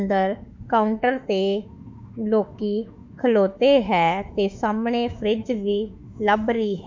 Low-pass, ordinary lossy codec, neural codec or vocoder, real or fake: 7.2 kHz; MP3, 64 kbps; autoencoder, 48 kHz, 32 numbers a frame, DAC-VAE, trained on Japanese speech; fake